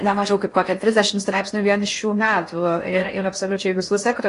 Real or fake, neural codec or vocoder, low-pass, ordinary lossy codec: fake; codec, 16 kHz in and 24 kHz out, 0.6 kbps, FocalCodec, streaming, 4096 codes; 10.8 kHz; AAC, 48 kbps